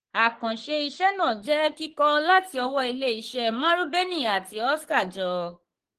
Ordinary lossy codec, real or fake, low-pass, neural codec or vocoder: Opus, 16 kbps; fake; 14.4 kHz; codec, 44.1 kHz, 3.4 kbps, Pupu-Codec